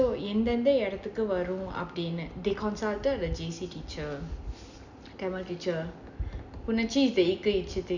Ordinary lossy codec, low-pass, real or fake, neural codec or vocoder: none; 7.2 kHz; real; none